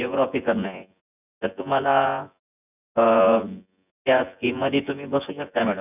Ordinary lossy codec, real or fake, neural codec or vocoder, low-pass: none; fake; vocoder, 24 kHz, 100 mel bands, Vocos; 3.6 kHz